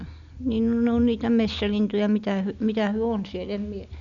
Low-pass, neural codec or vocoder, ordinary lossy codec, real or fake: 7.2 kHz; none; none; real